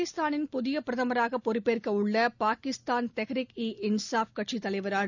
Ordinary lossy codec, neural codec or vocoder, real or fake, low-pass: none; none; real; 7.2 kHz